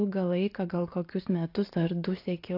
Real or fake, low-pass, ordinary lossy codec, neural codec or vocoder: real; 5.4 kHz; AAC, 32 kbps; none